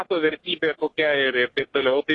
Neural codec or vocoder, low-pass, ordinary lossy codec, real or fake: codec, 44.1 kHz, 3.4 kbps, Pupu-Codec; 10.8 kHz; AAC, 32 kbps; fake